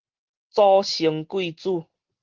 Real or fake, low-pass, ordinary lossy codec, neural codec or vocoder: real; 7.2 kHz; Opus, 16 kbps; none